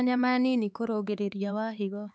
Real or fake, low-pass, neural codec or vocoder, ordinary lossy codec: fake; none; codec, 16 kHz, 4 kbps, X-Codec, HuBERT features, trained on LibriSpeech; none